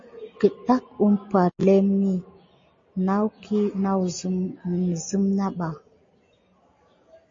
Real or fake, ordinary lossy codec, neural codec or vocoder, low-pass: real; MP3, 32 kbps; none; 7.2 kHz